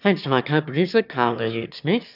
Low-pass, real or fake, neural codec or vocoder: 5.4 kHz; fake; autoencoder, 22.05 kHz, a latent of 192 numbers a frame, VITS, trained on one speaker